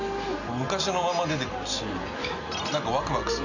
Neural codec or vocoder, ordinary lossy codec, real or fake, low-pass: none; none; real; 7.2 kHz